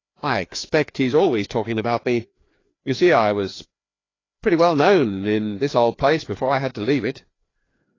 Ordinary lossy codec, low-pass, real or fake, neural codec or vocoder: AAC, 32 kbps; 7.2 kHz; fake; codec, 16 kHz, 2 kbps, FreqCodec, larger model